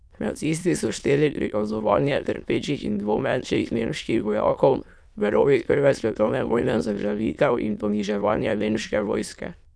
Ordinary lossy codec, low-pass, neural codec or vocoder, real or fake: none; none; autoencoder, 22.05 kHz, a latent of 192 numbers a frame, VITS, trained on many speakers; fake